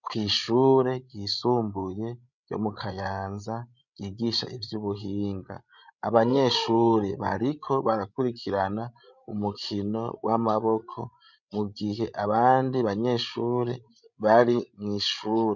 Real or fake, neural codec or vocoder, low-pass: fake; codec, 16 kHz, 16 kbps, FreqCodec, larger model; 7.2 kHz